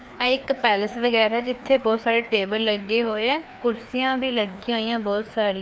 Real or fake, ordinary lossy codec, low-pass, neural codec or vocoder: fake; none; none; codec, 16 kHz, 2 kbps, FreqCodec, larger model